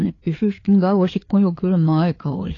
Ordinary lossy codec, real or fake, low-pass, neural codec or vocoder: AAC, 32 kbps; fake; 7.2 kHz; codec, 16 kHz, 4 kbps, FreqCodec, larger model